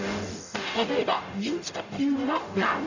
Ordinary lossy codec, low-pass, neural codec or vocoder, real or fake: none; 7.2 kHz; codec, 44.1 kHz, 0.9 kbps, DAC; fake